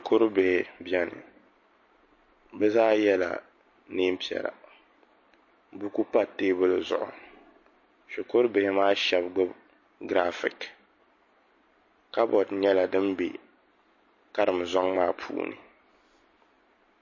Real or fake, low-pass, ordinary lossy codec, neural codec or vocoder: real; 7.2 kHz; MP3, 32 kbps; none